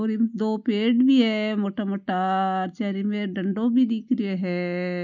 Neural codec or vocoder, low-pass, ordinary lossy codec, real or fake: none; 7.2 kHz; none; real